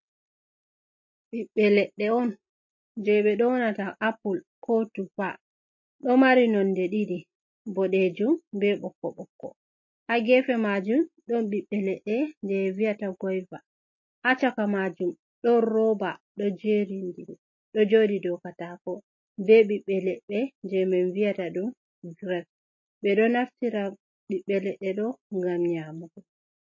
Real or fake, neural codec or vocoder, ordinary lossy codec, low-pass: real; none; MP3, 32 kbps; 7.2 kHz